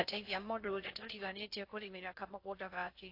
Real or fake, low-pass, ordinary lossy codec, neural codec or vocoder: fake; 5.4 kHz; AAC, 32 kbps; codec, 16 kHz in and 24 kHz out, 0.6 kbps, FocalCodec, streaming, 2048 codes